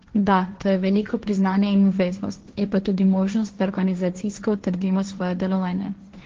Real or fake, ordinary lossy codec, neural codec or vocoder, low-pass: fake; Opus, 16 kbps; codec, 16 kHz, 1.1 kbps, Voila-Tokenizer; 7.2 kHz